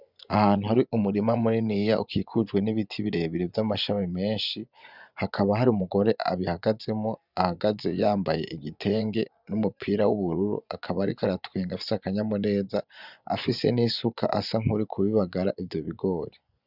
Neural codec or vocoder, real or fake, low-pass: vocoder, 24 kHz, 100 mel bands, Vocos; fake; 5.4 kHz